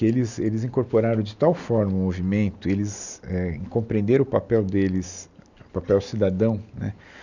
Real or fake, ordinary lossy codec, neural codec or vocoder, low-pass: real; none; none; 7.2 kHz